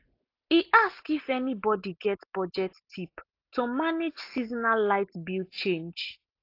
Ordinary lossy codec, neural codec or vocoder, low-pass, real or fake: AAC, 32 kbps; none; 5.4 kHz; real